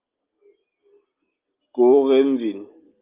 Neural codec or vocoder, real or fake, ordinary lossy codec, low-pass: none; real; Opus, 32 kbps; 3.6 kHz